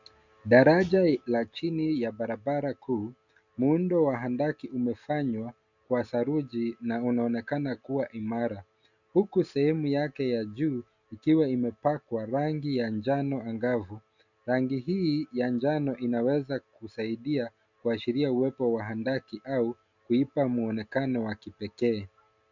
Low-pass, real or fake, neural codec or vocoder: 7.2 kHz; real; none